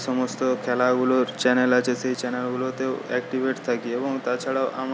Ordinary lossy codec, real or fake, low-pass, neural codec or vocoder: none; real; none; none